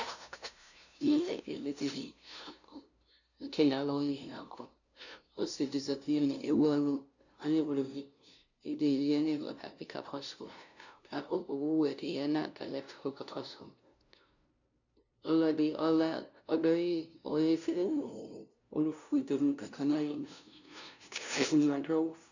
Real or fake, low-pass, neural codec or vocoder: fake; 7.2 kHz; codec, 16 kHz, 0.5 kbps, FunCodec, trained on LibriTTS, 25 frames a second